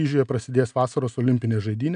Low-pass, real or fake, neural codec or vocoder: 9.9 kHz; real; none